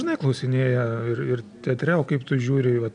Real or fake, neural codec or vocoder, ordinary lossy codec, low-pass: real; none; MP3, 96 kbps; 9.9 kHz